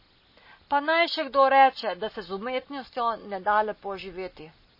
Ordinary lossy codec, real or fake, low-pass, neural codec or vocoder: MP3, 24 kbps; real; 5.4 kHz; none